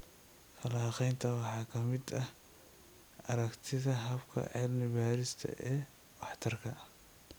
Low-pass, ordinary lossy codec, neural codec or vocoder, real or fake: none; none; none; real